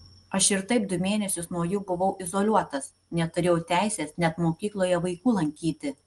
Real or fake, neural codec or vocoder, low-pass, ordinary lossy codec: real; none; 10.8 kHz; Opus, 24 kbps